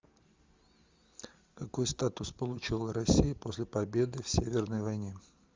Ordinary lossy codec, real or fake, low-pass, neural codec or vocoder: Opus, 32 kbps; real; 7.2 kHz; none